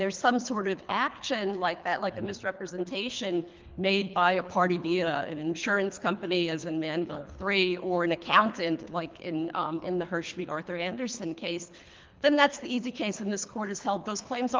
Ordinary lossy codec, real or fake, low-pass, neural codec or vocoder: Opus, 24 kbps; fake; 7.2 kHz; codec, 24 kHz, 3 kbps, HILCodec